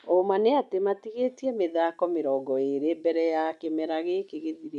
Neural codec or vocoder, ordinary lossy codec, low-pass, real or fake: none; none; 10.8 kHz; real